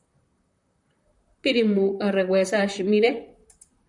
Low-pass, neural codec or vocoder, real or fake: 10.8 kHz; vocoder, 44.1 kHz, 128 mel bands, Pupu-Vocoder; fake